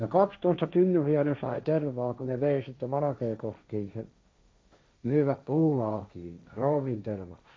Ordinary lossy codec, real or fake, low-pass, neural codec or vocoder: none; fake; none; codec, 16 kHz, 1.1 kbps, Voila-Tokenizer